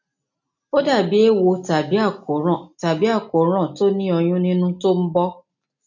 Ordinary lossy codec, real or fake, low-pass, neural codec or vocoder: none; real; 7.2 kHz; none